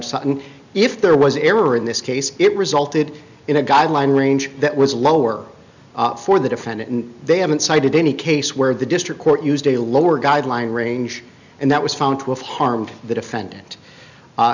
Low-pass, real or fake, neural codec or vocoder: 7.2 kHz; real; none